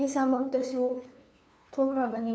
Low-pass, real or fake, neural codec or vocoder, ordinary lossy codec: none; fake; codec, 16 kHz, 2 kbps, FunCodec, trained on LibriTTS, 25 frames a second; none